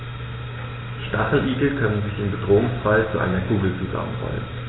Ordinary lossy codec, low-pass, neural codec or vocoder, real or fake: AAC, 16 kbps; 7.2 kHz; none; real